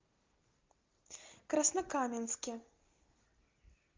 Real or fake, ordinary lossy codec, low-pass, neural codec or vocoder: real; Opus, 24 kbps; 7.2 kHz; none